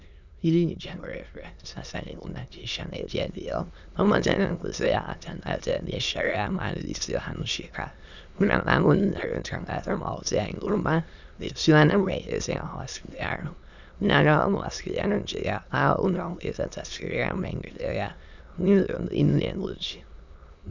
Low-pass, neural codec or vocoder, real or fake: 7.2 kHz; autoencoder, 22.05 kHz, a latent of 192 numbers a frame, VITS, trained on many speakers; fake